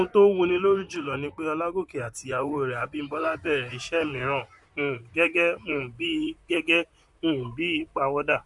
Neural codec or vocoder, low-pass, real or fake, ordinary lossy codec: vocoder, 44.1 kHz, 128 mel bands, Pupu-Vocoder; 10.8 kHz; fake; none